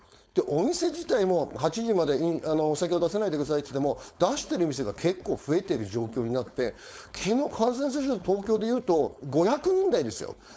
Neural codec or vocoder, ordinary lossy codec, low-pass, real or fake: codec, 16 kHz, 4.8 kbps, FACodec; none; none; fake